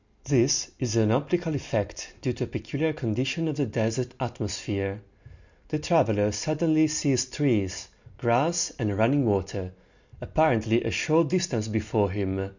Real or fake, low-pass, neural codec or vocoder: real; 7.2 kHz; none